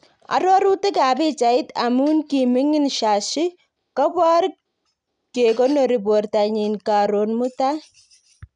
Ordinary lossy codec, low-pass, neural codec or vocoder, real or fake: none; 9.9 kHz; none; real